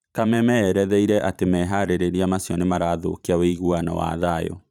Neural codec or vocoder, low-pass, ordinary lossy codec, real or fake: vocoder, 44.1 kHz, 128 mel bands every 512 samples, BigVGAN v2; 19.8 kHz; none; fake